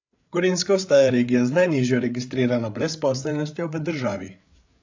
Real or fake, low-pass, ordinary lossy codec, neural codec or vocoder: fake; 7.2 kHz; AAC, 48 kbps; codec, 16 kHz, 8 kbps, FreqCodec, larger model